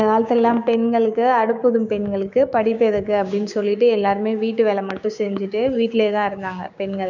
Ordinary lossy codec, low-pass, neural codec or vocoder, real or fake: none; 7.2 kHz; codec, 16 kHz, 6 kbps, DAC; fake